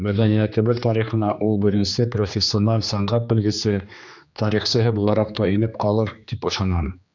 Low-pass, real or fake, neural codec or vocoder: 7.2 kHz; fake; codec, 16 kHz, 2 kbps, X-Codec, HuBERT features, trained on general audio